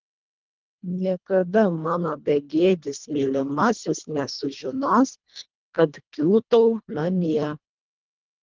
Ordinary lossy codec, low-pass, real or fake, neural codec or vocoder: Opus, 32 kbps; 7.2 kHz; fake; codec, 24 kHz, 1.5 kbps, HILCodec